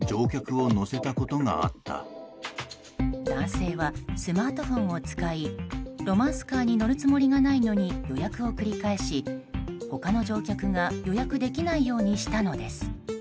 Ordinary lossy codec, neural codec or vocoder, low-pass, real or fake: none; none; none; real